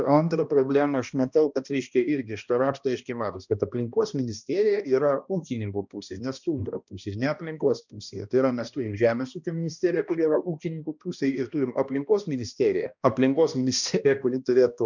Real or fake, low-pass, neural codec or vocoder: fake; 7.2 kHz; codec, 16 kHz, 1 kbps, X-Codec, HuBERT features, trained on balanced general audio